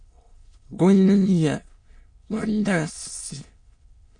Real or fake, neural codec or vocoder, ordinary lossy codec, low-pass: fake; autoencoder, 22.05 kHz, a latent of 192 numbers a frame, VITS, trained on many speakers; MP3, 48 kbps; 9.9 kHz